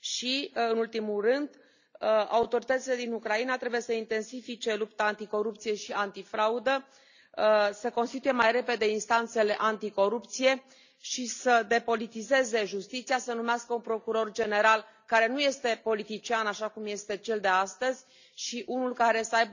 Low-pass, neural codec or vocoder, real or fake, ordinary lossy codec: 7.2 kHz; none; real; none